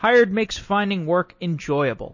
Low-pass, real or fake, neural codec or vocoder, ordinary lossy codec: 7.2 kHz; real; none; MP3, 32 kbps